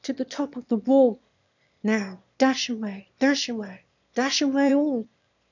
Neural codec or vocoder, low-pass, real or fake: autoencoder, 22.05 kHz, a latent of 192 numbers a frame, VITS, trained on one speaker; 7.2 kHz; fake